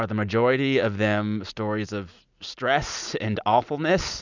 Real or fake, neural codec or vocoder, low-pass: real; none; 7.2 kHz